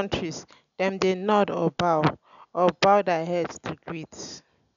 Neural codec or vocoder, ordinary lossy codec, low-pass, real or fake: none; none; 7.2 kHz; real